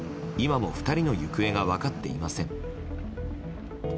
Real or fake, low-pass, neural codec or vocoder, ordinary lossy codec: real; none; none; none